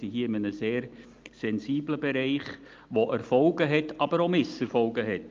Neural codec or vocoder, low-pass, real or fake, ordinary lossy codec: none; 7.2 kHz; real; Opus, 24 kbps